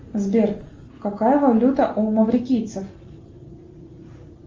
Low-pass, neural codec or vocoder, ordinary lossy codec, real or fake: 7.2 kHz; none; Opus, 32 kbps; real